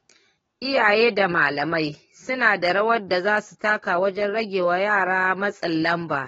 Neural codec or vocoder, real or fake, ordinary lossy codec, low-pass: codec, 44.1 kHz, 7.8 kbps, DAC; fake; AAC, 24 kbps; 19.8 kHz